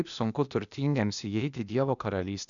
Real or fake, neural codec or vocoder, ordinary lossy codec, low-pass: fake; codec, 16 kHz, 0.8 kbps, ZipCodec; MP3, 96 kbps; 7.2 kHz